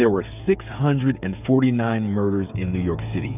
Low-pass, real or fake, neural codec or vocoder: 3.6 kHz; fake; codec, 16 kHz, 8 kbps, FreqCodec, smaller model